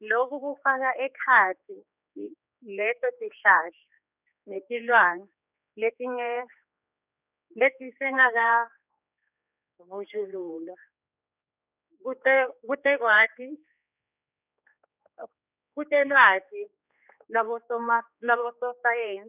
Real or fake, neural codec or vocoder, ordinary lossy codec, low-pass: fake; codec, 16 kHz, 4 kbps, X-Codec, HuBERT features, trained on general audio; none; 3.6 kHz